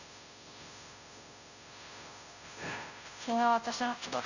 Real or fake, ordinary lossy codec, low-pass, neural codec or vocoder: fake; none; 7.2 kHz; codec, 16 kHz, 0.5 kbps, FunCodec, trained on Chinese and English, 25 frames a second